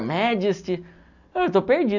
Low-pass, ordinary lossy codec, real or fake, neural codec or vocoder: 7.2 kHz; none; real; none